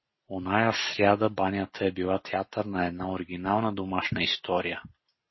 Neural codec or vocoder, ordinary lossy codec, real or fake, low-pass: none; MP3, 24 kbps; real; 7.2 kHz